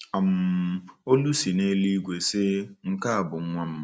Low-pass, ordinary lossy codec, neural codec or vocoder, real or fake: none; none; none; real